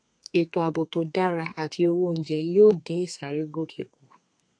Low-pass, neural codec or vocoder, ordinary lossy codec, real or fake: 9.9 kHz; codec, 32 kHz, 1.9 kbps, SNAC; AAC, 48 kbps; fake